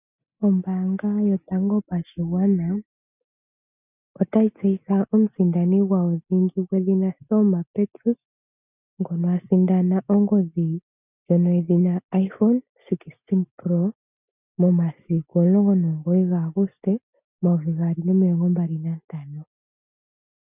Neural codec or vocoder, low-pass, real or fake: none; 3.6 kHz; real